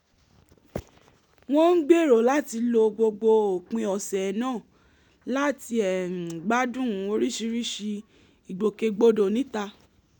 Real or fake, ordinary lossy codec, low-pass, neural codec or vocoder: real; none; 19.8 kHz; none